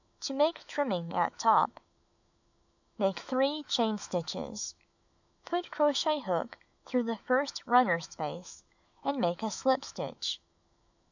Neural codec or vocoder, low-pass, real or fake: autoencoder, 48 kHz, 128 numbers a frame, DAC-VAE, trained on Japanese speech; 7.2 kHz; fake